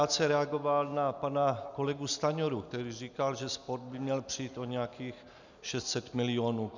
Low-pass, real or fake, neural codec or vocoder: 7.2 kHz; real; none